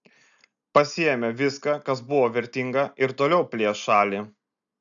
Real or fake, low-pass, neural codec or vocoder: real; 7.2 kHz; none